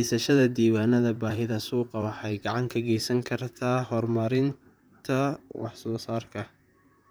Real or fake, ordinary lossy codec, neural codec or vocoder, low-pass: fake; none; vocoder, 44.1 kHz, 128 mel bands, Pupu-Vocoder; none